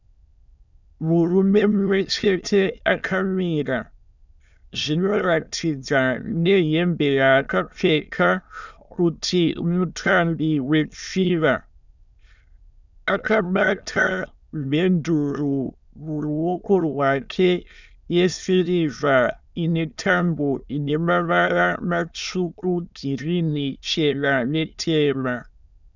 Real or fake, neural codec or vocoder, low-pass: fake; autoencoder, 22.05 kHz, a latent of 192 numbers a frame, VITS, trained on many speakers; 7.2 kHz